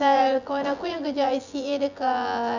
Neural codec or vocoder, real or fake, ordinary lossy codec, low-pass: vocoder, 24 kHz, 100 mel bands, Vocos; fake; none; 7.2 kHz